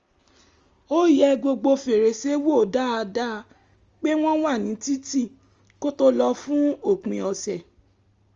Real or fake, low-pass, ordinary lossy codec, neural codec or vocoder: real; 7.2 kHz; Opus, 32 kbps; none